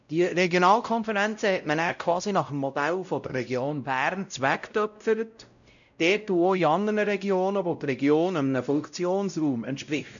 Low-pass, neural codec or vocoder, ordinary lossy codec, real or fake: 7.2 kHz; codec, 16 kHz, 0.5 kbps, X-Codec, WavLM features, trained on Multilingual LibriSpeech; none; fake